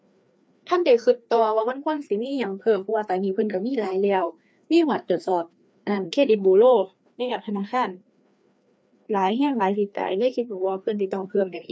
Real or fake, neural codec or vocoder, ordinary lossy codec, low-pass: fake; codec, 16 kHz, 2 kbps, FreqCodec, larger model; none; none